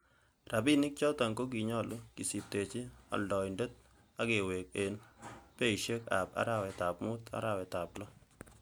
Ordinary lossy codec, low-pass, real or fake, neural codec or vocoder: none; none; real; none